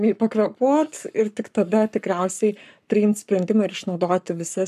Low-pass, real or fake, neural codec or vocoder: 14.4 kHz; fake; codec, 44.1 kHz, 7.8 kbps, Pupu-Codec